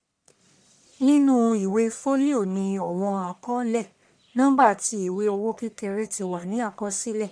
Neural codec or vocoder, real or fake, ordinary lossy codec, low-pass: codec, 44.1 kHz, 1.7 kbps, Pupu-Codec; fake; none; 9.9 kHz